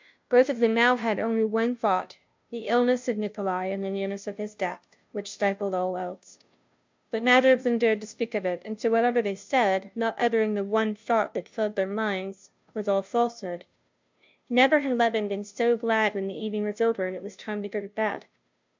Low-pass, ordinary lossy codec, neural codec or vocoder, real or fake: 7.2 kHz; MP3, 64 kbps; codec, 16 kHz, 0.5 kbps, FunCodec, trained on Chinese and English, 25 frames a second; fake